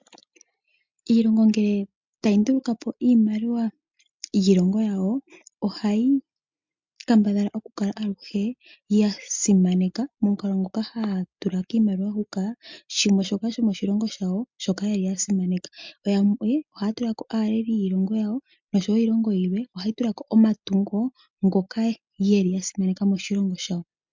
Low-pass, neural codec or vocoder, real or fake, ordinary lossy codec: 7.2 kHz; none; real; MP3, 64 kbps